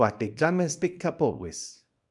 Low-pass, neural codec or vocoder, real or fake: 10.8 kHz; codec, 24 kHz, 0.9 kbps, WavTokenizer, small release; fake